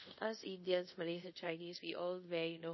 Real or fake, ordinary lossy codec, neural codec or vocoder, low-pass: fake; MP3, 24 kbps; codec, 24 kHz, 0.5 kbps, DualCodec; 7.2 kHz